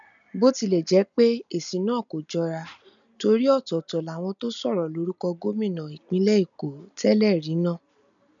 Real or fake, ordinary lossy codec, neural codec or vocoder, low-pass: real; none; none; 7.2 kHz